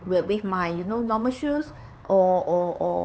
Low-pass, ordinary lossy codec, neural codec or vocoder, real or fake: none; none; codec, 16 kHz, 4 kbps, X-Codec, HuBERT features, trained on LibriSpeech; fake